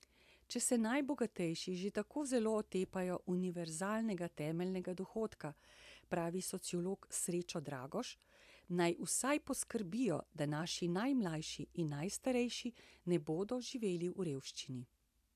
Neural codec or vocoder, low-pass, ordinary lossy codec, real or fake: none; 14.4 kHz; AAC, 96 kbps; real